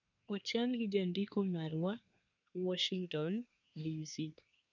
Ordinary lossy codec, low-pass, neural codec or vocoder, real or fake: none; 7.2 kHz; codec, 24 kHz, 1 kbps, SNAC; fake